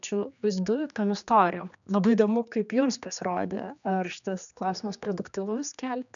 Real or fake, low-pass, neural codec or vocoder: fake; 7.2 kHz; codec, 16 kHz, 2 kbps, X-Codec, HuBERT features, trained on general audio